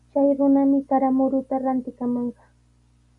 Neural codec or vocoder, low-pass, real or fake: none; 10.8 kHz; real